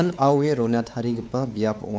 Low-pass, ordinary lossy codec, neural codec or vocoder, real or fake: none; none; codec, 16 kHz, 4 kbps, X-Codec, WavLM features, trained on Multilingual LibriSpeech; fake